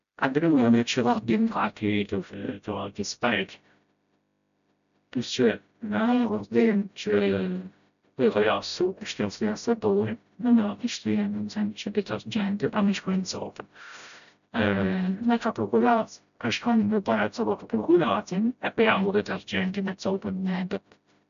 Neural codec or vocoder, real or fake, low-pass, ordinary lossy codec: codec, 16 kHz, 0.5 kbps, FreqCodec, smaller model; fake; 7.2 kHz; none